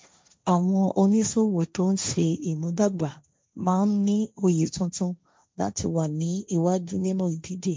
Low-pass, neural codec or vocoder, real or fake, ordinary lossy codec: none; codec, 16 kHz, 1.1 kbps, Voila-Tokenizer; fake; none